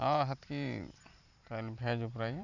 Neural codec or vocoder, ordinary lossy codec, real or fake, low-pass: vocoder, 44.1 kHz, 128 mel bands every 512 samples, BigVGAN v2; none; fake; 7.2 kHz